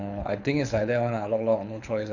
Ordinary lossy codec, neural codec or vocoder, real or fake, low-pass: none; codec, 24 kHz, 6 kbps, HILCodec; fake; 7.2 kHz